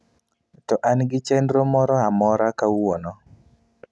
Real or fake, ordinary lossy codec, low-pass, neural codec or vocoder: real; none; none; none